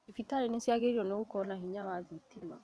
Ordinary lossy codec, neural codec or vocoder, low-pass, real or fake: none; vocoder, 22.05 kHz, 80 mel bands, WaveNeXt; none; fake